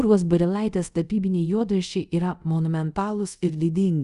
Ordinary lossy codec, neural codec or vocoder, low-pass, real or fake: Opus, 64 kbps; codec, 24 kHz, 0.5 kbps, DualCodec; 10.8 kHz; fake